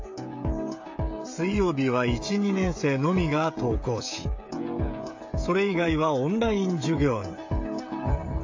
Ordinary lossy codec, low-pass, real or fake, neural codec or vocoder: AAC, 48 kbps; 7.2 kHz; fake; codec, 16 kHz, 16 kbps, FreqCodec, smaller model